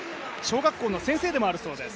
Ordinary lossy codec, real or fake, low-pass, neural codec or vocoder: none; real; none; none